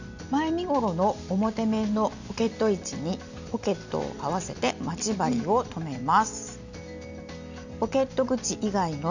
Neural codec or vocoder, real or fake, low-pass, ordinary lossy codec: none; real; 7.2 kHz; Opus, 64 kbps